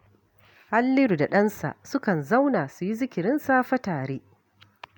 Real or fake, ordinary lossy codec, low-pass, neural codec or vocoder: real; none; 19.8 kHz; none